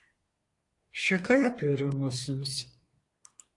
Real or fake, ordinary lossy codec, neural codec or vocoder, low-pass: fake; AAC, 48 kbps; codec, 24 kHz, 1 kbps, SNAC; 10.8 kHz